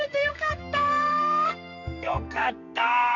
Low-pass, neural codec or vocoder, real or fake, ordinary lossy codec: 7.2 kHz; codec, 44.1 kHz, 2.6 kbps, SNAC; fake; none